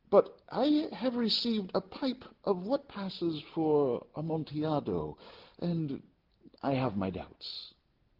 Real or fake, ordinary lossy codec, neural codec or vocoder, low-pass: real; Opus, 16 kbps; none; 5.4 kHz